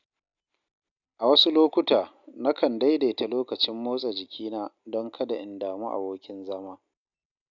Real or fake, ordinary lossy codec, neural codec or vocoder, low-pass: real; none; none; 7.2 kHz